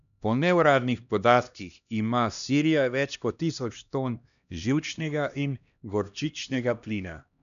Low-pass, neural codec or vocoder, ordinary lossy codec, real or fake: 7.2 kHz; codec, 16 kHz, 1 kbps, X-Codec, HuBERT features, trained on LibriSpeech; none; fake